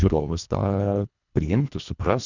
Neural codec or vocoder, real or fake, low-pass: codec, 24 kHz, 1.5 kbps, HILCodec; fake; 7.2 kHz